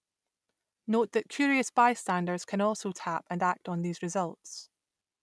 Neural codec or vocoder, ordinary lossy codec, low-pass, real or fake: none; none; none; real